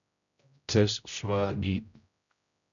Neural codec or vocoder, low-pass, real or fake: codec, 16 kHz, 0.5 kbps, X-Codec, HuBERT features, trained on general audio; 7.2 kHz; fake